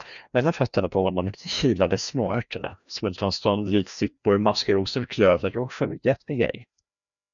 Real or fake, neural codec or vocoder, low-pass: fake; codec, 16 kHz, 1 kbps, FreqCodec, larger model; 7.2 kHz